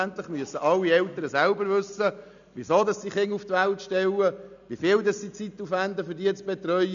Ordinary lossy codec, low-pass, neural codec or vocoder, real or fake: none; 7.2 kHz; none; real